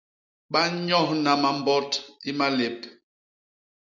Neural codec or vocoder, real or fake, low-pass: none; real; 7.2 kHz